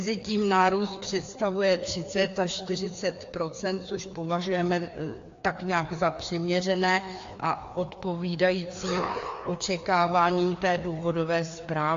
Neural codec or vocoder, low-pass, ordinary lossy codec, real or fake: codec, 16 kHz, 2 kbps, FreqCodec, larger model; 7.2 kHz; AAC, 48 kbps; fake